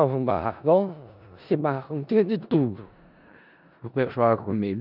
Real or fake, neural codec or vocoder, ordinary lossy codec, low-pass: fake; codec, 16 kHz in and 24 kHz out, 0.4 kbps, LongCat-Audio-Codec, four codebook decoder; none; 5.4 kHz